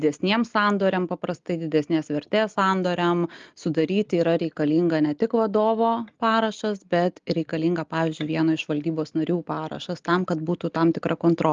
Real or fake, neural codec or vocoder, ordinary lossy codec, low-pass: real; none; Opus, 32 kbps; 7.2 kHz